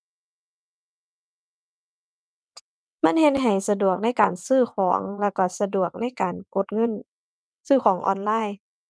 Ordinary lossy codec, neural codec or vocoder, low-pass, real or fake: none; vocoder, 22.05 kHz, 80 mel bands, WaveNeXt; none; fake